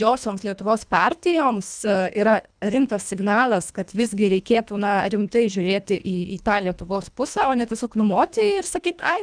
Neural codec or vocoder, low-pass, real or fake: codec, 24 kHz, 1.5 kbps, HILCodec; 9.9 kHz; fake